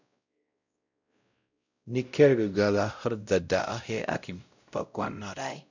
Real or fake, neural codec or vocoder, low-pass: fake; codec, 16 kHz, 0.5 kbps, X-Codec, WavLM features, trained on Multilingual LibriSpeech; 7.2 kHz